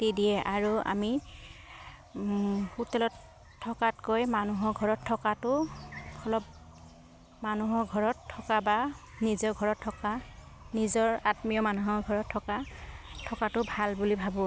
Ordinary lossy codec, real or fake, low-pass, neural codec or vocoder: none; real; none; none